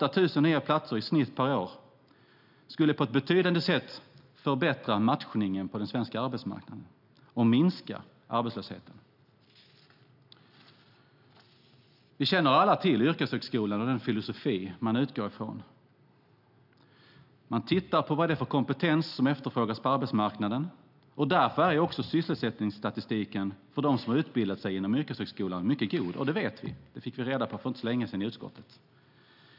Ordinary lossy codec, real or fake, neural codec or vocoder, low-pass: none; real; none; 5.4 kHz